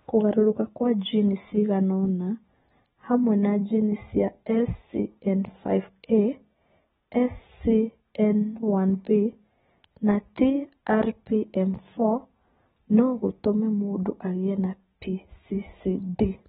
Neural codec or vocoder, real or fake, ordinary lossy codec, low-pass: none; real; AAC, 16 kbps; 10.8 kHz